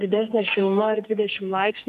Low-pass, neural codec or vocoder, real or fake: 14.4 kHz; codec, 44.1 kHz, 2.6 kbps, SNAC; fake